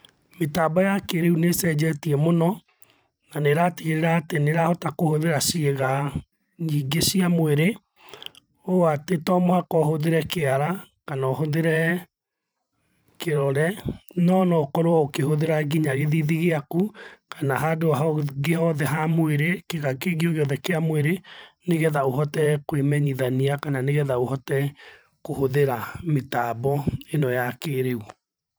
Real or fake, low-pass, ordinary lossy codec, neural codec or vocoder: fake; none; none; vocoder, 44.1 kHz, 128 mel bands every 512 samples, BigVGAN v2